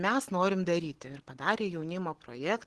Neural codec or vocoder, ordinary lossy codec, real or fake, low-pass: none; Opus, 16 kbps; real; 10.8 kHz